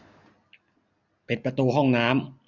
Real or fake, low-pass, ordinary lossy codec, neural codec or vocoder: real; 7.2 kHz; none; none